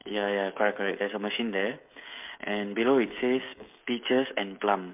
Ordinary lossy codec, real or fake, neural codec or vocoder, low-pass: MP3, 32 kbps; fake; codec, 16 kHz, 16 kbps, FreqCodec, smaller model; 3.6 kHz